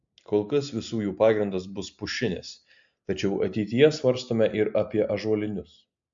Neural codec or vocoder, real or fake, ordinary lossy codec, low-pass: none; real; AAC, 64 kbps; 7.2 kHz